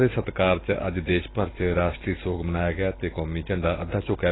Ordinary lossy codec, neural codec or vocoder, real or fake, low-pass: AAC, 16 kbps; none; real; 7.2 kHz